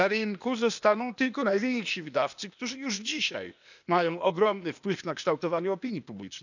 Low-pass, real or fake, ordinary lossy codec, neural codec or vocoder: 7.2 kHz; fake; none; codec, 16 kHz, 0.8 kbps, ZipCodec